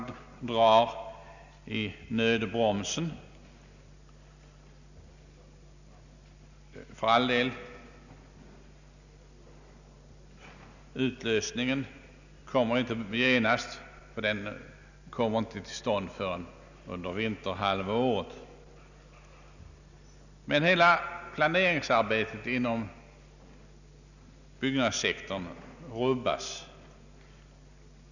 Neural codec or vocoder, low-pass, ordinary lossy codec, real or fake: none; 7.2 kHz; none; real